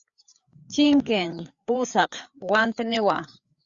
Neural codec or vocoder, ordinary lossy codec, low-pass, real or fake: codec, 16 kHz, 4 kbps, FreqCodec, larger model; Opus, 64 kbps; 7.2 kHz; fake